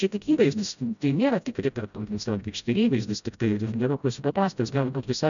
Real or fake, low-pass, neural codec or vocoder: fake; 7.2 kHz; codec, 16 kHz, 0.5 kbps, FreqCodec, smaller model